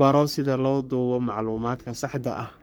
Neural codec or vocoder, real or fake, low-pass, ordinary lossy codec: codec, 44.1 kHz, 3.4 kbps, Pupu-Codec; fake; none; none